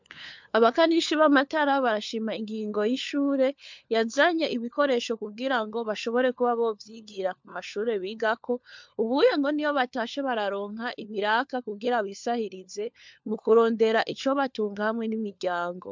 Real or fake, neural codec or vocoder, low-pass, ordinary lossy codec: fake; codec, 16 kHz, 4 kbps, FunCodec, trained on LibriTTS, 50 frames a second; 7.2 kHz; MP3, 64 kbps